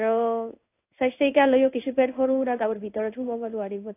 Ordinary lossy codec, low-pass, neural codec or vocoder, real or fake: AAC, 24 kbps; 3.6 kHz; codec, 16 kHz in and 24 kHz out, 1 kbps, XY-Tokenizer; fake